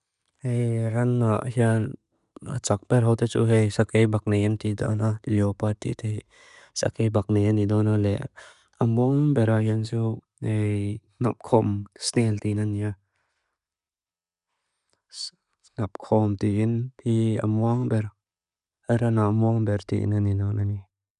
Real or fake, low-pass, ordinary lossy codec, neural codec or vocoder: real; 10.8 kHz; none; none